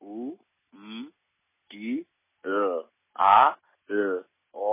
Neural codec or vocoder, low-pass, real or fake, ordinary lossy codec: none; 3.6 kHz; real; MP3, 16 kbps